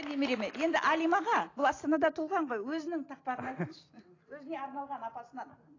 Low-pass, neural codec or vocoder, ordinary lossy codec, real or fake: 7.2 kHz; none; AAC, 32 kbps; real